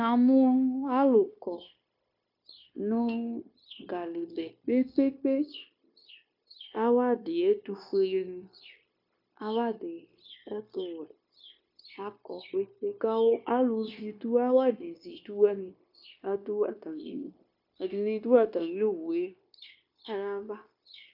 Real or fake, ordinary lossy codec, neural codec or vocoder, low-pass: fake; MP3, 48 kbps; codec, 16 kHz, 0.9 kbps, LongCat-Audio-Codec; 5.4 kHz